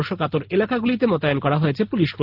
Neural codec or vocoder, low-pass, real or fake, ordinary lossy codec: none; 5.4 kHz; real; Opus, 16 kbps